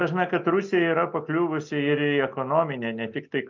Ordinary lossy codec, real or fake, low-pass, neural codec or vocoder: MP3, 48 kbps; real; 7.2 kHz; none